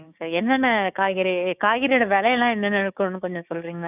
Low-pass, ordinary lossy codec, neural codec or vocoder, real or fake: 3.6 kHz; none; codec, 16 kHz, 6 kbps, DAC; fake